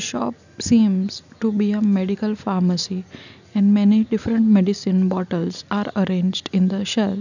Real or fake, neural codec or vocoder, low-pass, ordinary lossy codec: real; none; 7.2 kHz; none